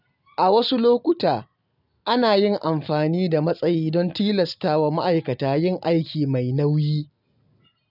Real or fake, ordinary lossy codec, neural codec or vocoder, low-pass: real; none; none; 5.4 kHz